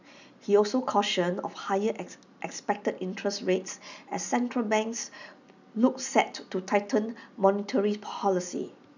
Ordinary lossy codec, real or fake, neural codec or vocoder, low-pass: none; real; none; 7.2 kHz